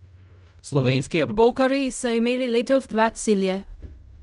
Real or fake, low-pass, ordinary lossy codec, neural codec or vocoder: fake; 10.8 kHz; none; codec, 16 kHz in and 24 kHz out, 0.4 kbps, LongCat-Audio-Codec, fine tuned four codebook decoder